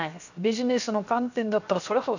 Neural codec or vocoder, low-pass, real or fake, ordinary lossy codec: codec, 16 kHz, 0.7 kbps, FocalCodec; 7.2 kHz; fake; none